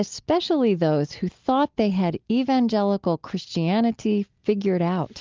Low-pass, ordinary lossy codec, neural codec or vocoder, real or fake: 7.2 kHz; Opus, 32 kbps; none; real